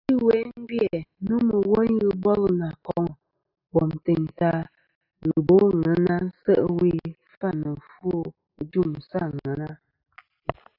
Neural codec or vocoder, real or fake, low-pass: none; real; 5.4 kHz